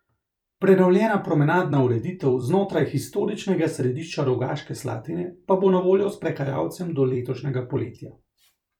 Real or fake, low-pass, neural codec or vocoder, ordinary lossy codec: fake; 19.8 kHz; vocoder, 44.1 kHz, 128 mel bands every 256 samples, BigVGAN v2; none